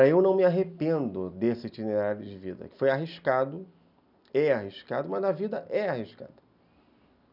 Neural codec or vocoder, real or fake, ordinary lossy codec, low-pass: none; real; none; 5.4 kHz